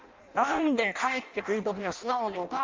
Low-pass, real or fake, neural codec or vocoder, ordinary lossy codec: 7.2 kHz; fake; codec, 16 kHz in and 24 kHz out, 0.6 kbps, FireRedTTS-2 codec; Opus, 32 kbps